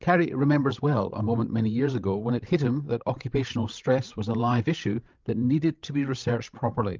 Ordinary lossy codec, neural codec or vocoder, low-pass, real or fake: Opus, 32 kbps; codec, 16 kHz, 16 kbps, FreqCodec, larger model; 7.2 kHz; fake